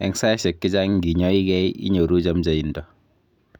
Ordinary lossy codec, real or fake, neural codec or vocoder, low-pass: none; real; none; 19.8 kHz